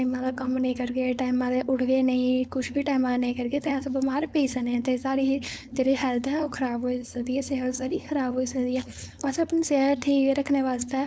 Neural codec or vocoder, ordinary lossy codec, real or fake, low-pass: codec, 16 kHz, 4.8 kbps, FACodec; none; fake; none